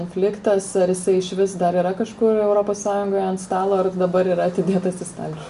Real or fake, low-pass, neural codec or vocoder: real; 10.8 kHz; none